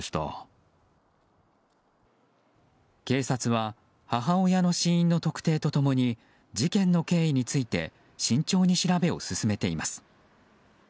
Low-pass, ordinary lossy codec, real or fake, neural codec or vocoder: none; none; real; none